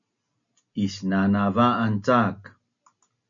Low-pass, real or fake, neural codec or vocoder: 7.2 kHz; real; none